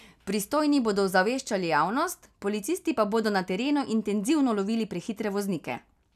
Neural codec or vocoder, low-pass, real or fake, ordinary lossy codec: none; 14.4 kHz; real; none